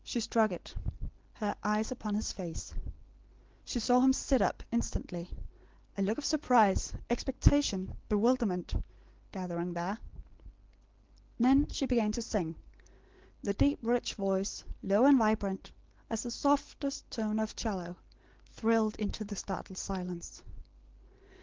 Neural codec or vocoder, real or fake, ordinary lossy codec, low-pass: none; real; Opus, 16 kbps; 7.2 kHz